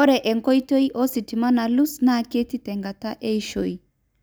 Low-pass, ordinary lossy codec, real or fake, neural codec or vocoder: none; none; real; none